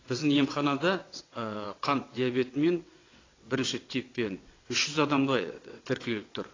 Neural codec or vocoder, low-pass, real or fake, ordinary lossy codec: vocoder, 22.05 kHz, 80 mel bands, WaveNeXt; 7.2 kHz; fake; AAC, 32 kbps